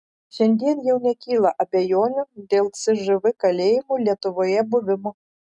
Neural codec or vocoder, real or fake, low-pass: none; real; 10.8 kHz